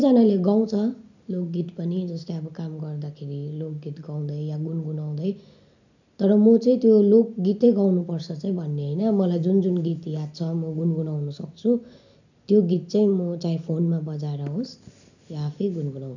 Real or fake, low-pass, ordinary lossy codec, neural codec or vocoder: real; 7.2 kHz; none; none